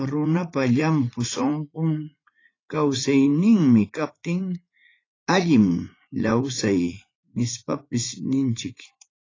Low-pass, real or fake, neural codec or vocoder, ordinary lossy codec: 7.2 kHz; fake; vocoder, 44.1 kHz, 80 mel bands, Vocos; AAC, 32 kbps